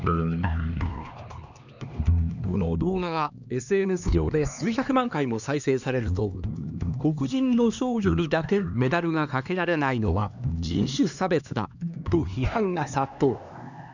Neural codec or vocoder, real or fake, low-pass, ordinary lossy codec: codec, 16 kHz, 2 kbps, X-Codec, HuBERT features, trained on LibriSpeech; fake; 7.2 kHz; none